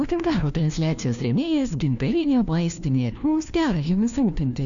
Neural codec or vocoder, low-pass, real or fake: codec, 16 kHz, 1 kbps, FunCodec, trained on LibriTTS, 50 frames a second; 7.2 kHz; fake